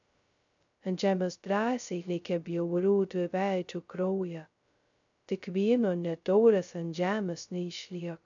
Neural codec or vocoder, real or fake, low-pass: codec, 16 kHz, 0.2 kbps, FocalCodec; fake; 7.2 kHz